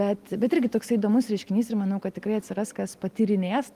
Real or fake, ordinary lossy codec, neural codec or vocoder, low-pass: real; Opus, 24 kbps; none; 14.4 kHz